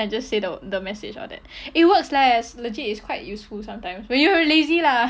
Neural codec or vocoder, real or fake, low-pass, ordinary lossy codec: none; real; none; none